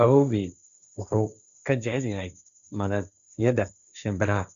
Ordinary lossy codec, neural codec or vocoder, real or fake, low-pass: none; codec, 16 kHz, 1.1 kbps, Voila-Tokenizer; fake; 7.2 kHz